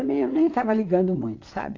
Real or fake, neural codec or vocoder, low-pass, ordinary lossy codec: fake; vocoder, 22.05 kHz, 80 mel bands, Vocos; 7.2 kHz; AAC, 32 kbps